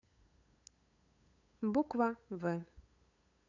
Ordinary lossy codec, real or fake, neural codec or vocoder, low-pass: none; fake; codec, 16 kHz, 8 kbps, FunCodec, trained on LibriTTS, 25 frames a second; 7.2 kHz